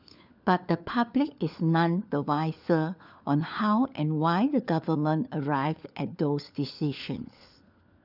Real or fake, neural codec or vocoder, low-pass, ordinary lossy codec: fake; codec, 16 kHz, 4 kbps, FunCodec, trained on LibriTTS, 50 frames a second; 5.4 kHz; none